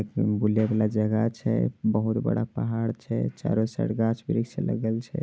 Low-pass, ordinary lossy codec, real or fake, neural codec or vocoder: none; none; real; none